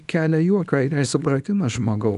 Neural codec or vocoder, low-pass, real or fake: codec, 24 kHz, 0.9 kbps, WavTokenizer, small release; 10.8 kHz; fake